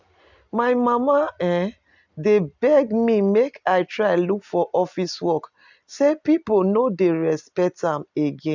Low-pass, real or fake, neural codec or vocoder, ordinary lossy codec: 7.2 kHz; real; none; none